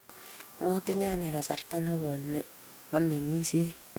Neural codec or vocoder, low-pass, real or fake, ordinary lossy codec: codec, 44.1 kHz, 2.6 kbps, DAC; none; fake; none